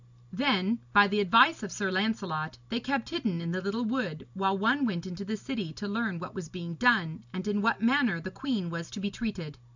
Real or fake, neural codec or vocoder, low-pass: real; none; 7.2 kHz